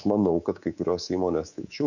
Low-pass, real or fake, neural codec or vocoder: 7.2 kHz; fake; codec, 24 kHz, 3.1 kbps, DualCodec